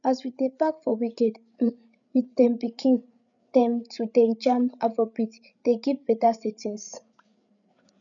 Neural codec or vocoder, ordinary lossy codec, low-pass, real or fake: codec, 16 kHz, 8 kbps, FreqCodec, larger model; none; 7.2 kHz; fake